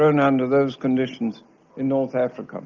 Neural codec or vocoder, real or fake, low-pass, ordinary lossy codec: none; real; 7.2 kHz; Opus, 24 kbps